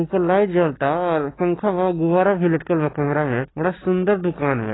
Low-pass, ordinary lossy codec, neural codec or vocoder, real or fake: 7.2 kHz; AAC, 16 kbps; none; real